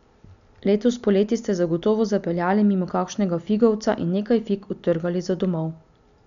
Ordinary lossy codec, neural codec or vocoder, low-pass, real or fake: none; none; 7.2 kHz; real